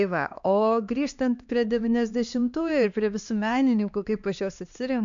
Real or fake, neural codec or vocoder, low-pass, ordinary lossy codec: fake; codec, 16 kHz, 2 kbps, X-Codec, HuBERT features, trained on LibriSpeech; 7.2 kHz; MP3, 64 kbps